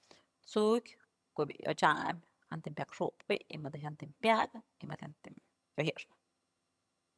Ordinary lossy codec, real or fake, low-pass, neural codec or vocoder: none; fake; none; vocoder, 22.05 kHz, 80 mel bands, HiFi-GAN